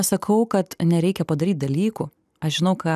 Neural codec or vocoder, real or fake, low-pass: none; real; 14.4 kHz